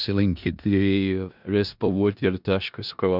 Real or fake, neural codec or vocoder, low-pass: fake; codec, 16 kHz in and 24 kHz out, 0.4 kbps, LongCat-Audio-Codec, four codebook decoder; 5.4 kHz